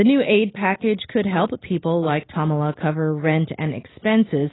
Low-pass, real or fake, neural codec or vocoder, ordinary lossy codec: 7.2 kHz; real; none; AAC, 16 kbps